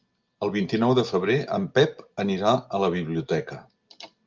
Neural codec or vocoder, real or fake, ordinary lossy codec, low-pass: none; real; Opus, 24 kbps; 7.2 kHz